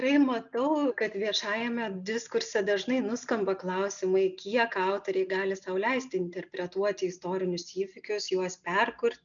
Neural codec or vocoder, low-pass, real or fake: none; 7.2 kHz; real